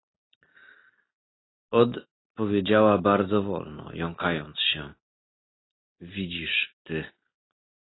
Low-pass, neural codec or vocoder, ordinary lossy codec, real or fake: 7.2 kHz; none; AAC, 16 kbps; real